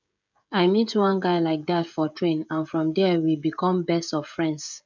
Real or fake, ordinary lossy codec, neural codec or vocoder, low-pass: fake; none; codec, 16 kHz, 16 kbps, FreqCodec, smaller model; 7.2 kHz